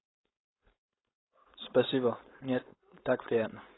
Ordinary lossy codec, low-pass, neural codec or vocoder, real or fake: AAC, 16 kbps; 7.2 kHz; codec, 16 kHz, 4.8 kbps, FACodec; fake